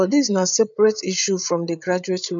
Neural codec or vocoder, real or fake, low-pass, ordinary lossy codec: codec, 16 kHz, 8 kbps, FreqCodec, larger model; fake; 7.2 kHz; none